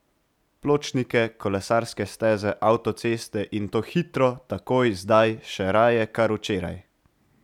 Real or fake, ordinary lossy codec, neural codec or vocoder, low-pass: real; none; none; 19.8 kHz